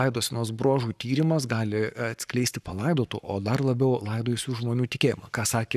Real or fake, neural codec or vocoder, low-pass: fake; codec, 44.1 kHz, 7.8 kbps, Pupu-Codec; 14.4 kHz